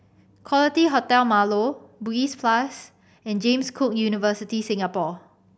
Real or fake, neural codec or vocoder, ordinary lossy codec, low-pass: real; none; none; none